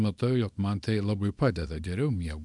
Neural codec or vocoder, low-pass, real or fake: codec, 24 kHz, 0.9 kbps, WavTokenizer, small release; 10.8 kHz; fake